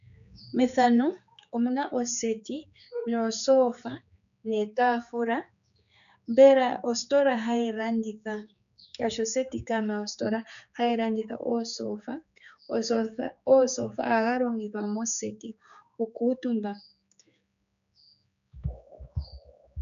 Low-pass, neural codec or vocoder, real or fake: 7.2 kHz; codec, 16 kHz, 4 kbps, X-Codec, HuBERT features, trained on general audio; fake